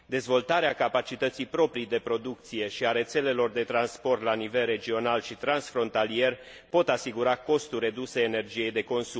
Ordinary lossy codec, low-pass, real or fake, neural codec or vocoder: none; none; real; none